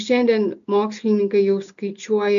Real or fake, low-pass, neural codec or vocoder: real; 7.2 kHz; none